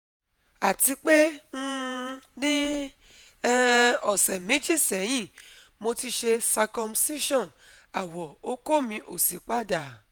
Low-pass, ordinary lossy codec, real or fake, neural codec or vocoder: none; none; fake; vocoder, 48 kHz, 128 mel bands, Vocos